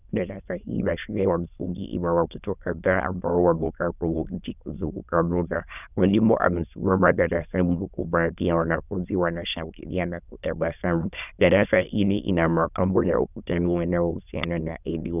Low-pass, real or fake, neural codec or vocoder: 3.6 kHz; fake; autoencoder, 22.05 kHz, a latent of 192 numbers a frame, VITS, trained on many speakers